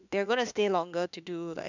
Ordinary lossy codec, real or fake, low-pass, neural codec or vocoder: none; fake; 7.2 kHz; autoencoder, 48 kHz, 32 numbers a frame, DAC-VAE, trained on Japanese speech